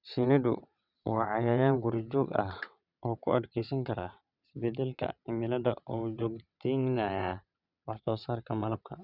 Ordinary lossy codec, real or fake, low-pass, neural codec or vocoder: none; fake; 5.4 kHz; vocoder, 22.05 kHz, 80 mel bands, WaveNeXt